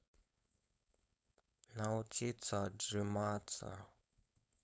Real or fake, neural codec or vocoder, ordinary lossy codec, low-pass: fake; codec, 16 kHz, 4.8 kbps, FACodec; none; none